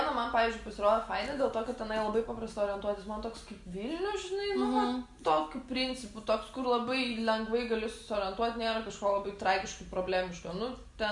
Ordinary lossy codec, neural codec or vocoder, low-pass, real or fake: Opus, 64 kbps; none; 10.8 kHz; real